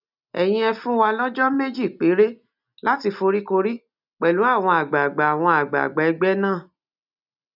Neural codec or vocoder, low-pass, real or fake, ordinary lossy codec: none; 5.4 kHz; real; none